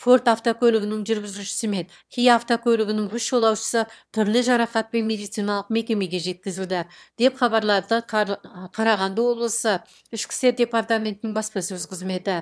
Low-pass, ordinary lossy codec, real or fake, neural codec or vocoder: none; none; fake; autoencoder, 22.05 kHz, a latent of 192 numbers a frame, VITS, trained on one speaker